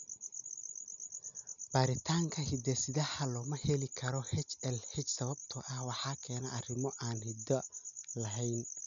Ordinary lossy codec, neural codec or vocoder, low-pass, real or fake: none; none; 7.2 kHz; real